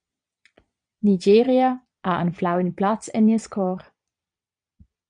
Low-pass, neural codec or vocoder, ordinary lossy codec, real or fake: 9.9 kHz; vocoder, 22.05 kHz, 80 mel bands, Vocos; MP3, 64 kbps; fake